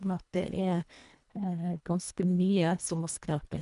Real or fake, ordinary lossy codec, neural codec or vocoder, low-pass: fake; MP3, 96 kbps; codec, 24 kHz, 1.5 kbps, HILCodec; 10.8 kHz